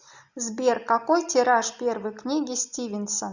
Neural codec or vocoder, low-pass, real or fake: none; 7.2 kHz; real